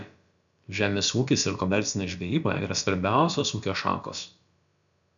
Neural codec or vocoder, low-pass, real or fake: codec, 16 kHz, about 1 kbps, DyCAST, with the encoder's durations; 7.2 kHz; fake